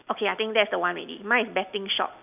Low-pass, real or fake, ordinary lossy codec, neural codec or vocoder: 3.6 kHz; real; none; none